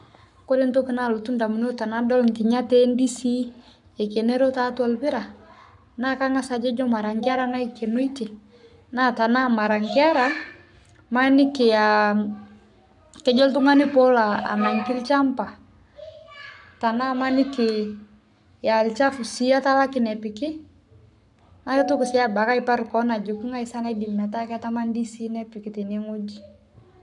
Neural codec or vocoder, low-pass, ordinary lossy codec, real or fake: codec, 44.1 kHz, 7.8 kbps, Pupu-Codec; 10.8 kHz; none; fake